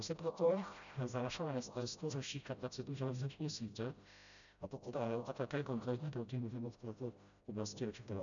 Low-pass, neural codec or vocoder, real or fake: 7.2 kHz; codec, 16 kHz, 0.5 kbps, FreqCodec, smaller model; fake